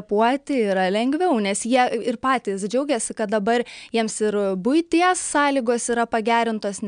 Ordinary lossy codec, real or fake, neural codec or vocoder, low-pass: MP3, 96 kbps; real; none; 9.9 kHz